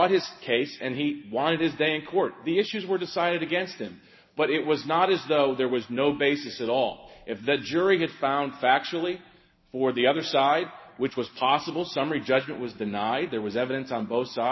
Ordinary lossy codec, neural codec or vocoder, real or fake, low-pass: MP3, 24 kbps; none; real; 7.2 kHz